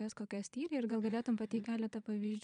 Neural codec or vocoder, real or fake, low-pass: vocoder, 22.05 kHz, 80 mel bands, Vocos; fake; 9.9 kHz